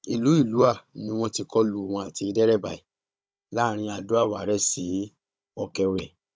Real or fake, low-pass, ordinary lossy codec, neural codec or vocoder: fake; none; none; codec, 16 kHz, 16 kbps, FunCodec, trained on Chinese and English, 50 frames a second